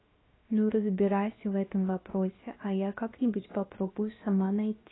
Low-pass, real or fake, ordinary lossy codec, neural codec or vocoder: 7.2 kHz; fake; AAC, 16 kbps; codec, 16 kHz, 0.7 kbps, FocalCodec